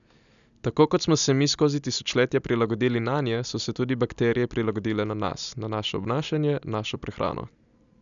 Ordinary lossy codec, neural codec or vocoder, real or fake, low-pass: none; none; real; 7.2 kHz